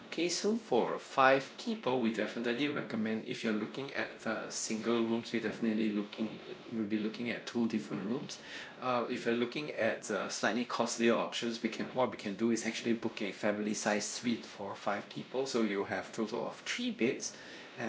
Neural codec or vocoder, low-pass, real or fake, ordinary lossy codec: codec, 16 kHz, 1 kbps, X-Codec, WavLM features, trained on Multilingual LibriSpeech; none; fake; none